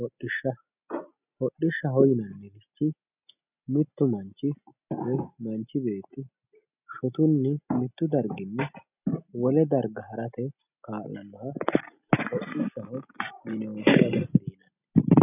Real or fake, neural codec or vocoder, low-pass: real; none; 3.6 kHz